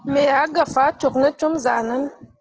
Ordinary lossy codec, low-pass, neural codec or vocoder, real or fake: Opus, 16 kbps; 7.2 kHz; none; real